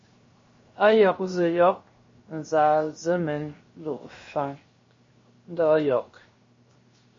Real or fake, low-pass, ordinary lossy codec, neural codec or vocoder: fake; 7.2 kHz; MP3, 32 kbps; codec, 16 kHz, 0.7 kbps, FocalCodec